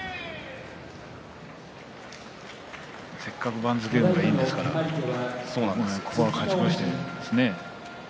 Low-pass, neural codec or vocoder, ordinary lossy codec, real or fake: none; none; none; real